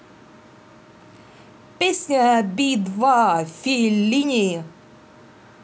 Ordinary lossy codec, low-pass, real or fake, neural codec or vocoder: none; none; real; none